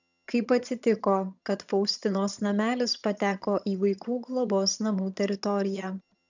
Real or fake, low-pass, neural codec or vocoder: fake; 7.2 kHz; vocoder, 22.05 kHz, 80 mel bands, HiFi-GAN